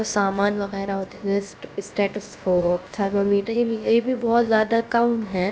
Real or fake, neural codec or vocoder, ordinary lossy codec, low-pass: fake; codec, 16 kHz, about 1 kbps, DyCAST, with the encoder's durations; none; none